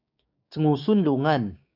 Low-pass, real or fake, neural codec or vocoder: 5.4 kHz; fake; codec, 16 kHz, 6 kbps, DAC